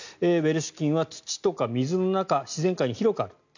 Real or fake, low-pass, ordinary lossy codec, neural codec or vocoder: real; 7.2 kHz; none; none